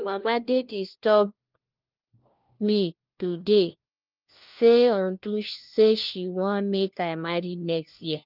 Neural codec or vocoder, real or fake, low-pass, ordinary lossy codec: codec, 16 kHz, 1 kbps, FunCodec, trained on LibriTTS, 50 frames a second; fake; 5.4 kHz; Opus, 32 kbps